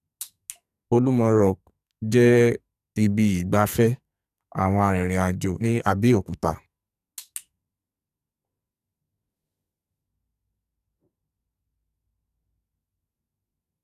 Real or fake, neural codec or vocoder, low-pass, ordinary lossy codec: fake; codec, 44.1 kHz, 2.6 kbps, SNAC; 14.4 kHz; none